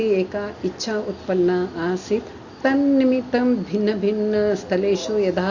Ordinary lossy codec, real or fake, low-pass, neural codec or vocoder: Opus, 64 kbps; real; 7.2 kHz; none